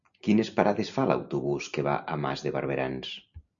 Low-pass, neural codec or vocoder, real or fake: 7.2 kHz; none; real